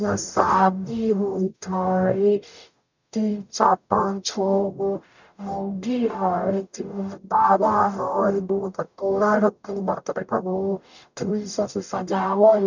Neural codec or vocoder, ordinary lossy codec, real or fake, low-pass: codec, 44.1 kHz, 0.9 kbps, DAC; none; fake; 7.2 kHz